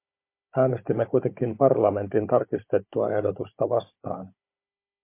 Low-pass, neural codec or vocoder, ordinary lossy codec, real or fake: 3.6 kHz; codec, 16 kHz, 16 kbps, FunCodec, trained on Chinese and English, 50 frames a second; MP3, 24 kbps; fake